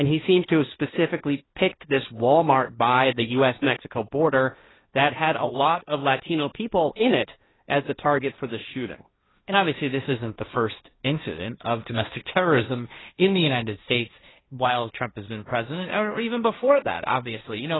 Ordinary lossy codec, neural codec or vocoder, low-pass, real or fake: AAC, 16 kbps; codec, 16 kHz, 1.1 kbps, Voila-Tokenizer; 7.2 kHz; fake